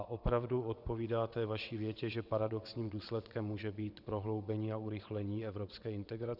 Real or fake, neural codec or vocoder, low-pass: fake; vocoder, 22.05 kHz, 80 mel bands, WaveNeXt; 5.4 kHz